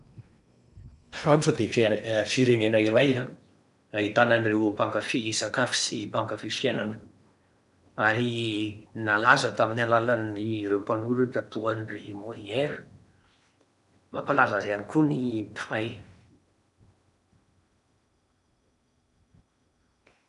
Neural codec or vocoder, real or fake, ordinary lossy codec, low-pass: codec, 16 kHz in and 24 kHz out, 0.8 kbps, FocalCodec, streaming, 65536 codes; fake; none; 10.8 kHz